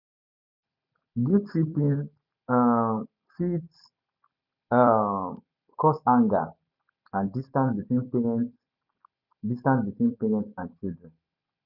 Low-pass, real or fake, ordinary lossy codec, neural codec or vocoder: 5.4 kHz; fake; none; vocoder, 44.1 kHz, 128 mel bands every 256 samples, BigVGAN v2